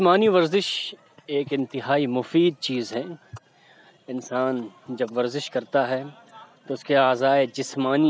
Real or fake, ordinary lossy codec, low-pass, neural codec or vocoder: real; none; none; none